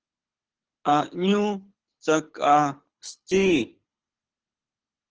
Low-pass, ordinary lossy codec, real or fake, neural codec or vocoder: 7.2 kHz; Opus, 16 kbps; fake; codec, 24 kHz, 6 kbps, HILCodec